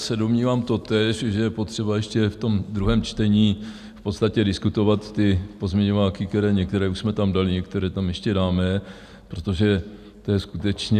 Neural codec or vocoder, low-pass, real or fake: none; 14.4 kHz; real